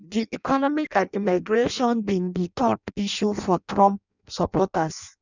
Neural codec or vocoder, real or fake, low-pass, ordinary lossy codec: codec, 16 kHz in and 24 kHz out, 0.6 kbps, FireRedTTS-2 codec; fake; 7.2 kHz; none